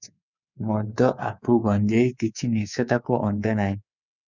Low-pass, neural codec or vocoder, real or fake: 7.2 kHz; codec, 24 kHz, 3.1 kbps, DualCodec; fake